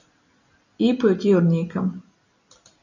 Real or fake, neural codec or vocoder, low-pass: real; none; 7.2 kHz